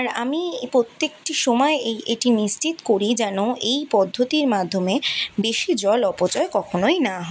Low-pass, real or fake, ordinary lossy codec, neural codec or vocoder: none; real; none; none